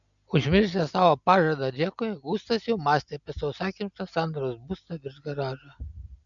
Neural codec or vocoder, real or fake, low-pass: none; real; 7.2 kHz